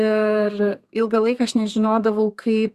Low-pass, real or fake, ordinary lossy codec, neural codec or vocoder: 14.4 kHz; fake; Opus, 64 kbps; codec, 32 kHz, 1.9 kbps, SNAC